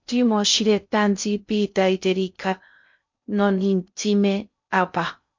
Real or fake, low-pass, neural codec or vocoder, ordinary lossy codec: fake; 7.2 kHz; codec, 16 kHz in and 24 kHz out, 0.6 kbps, FocalCodec, streaming, 2048 codes; MP3, 48 kbps